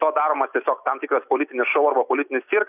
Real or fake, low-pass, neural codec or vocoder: real; 3.6 kHz; none